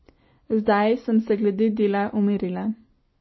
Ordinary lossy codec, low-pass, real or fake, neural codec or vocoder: MP3, 24 kbps; 7.2 kHz; real; none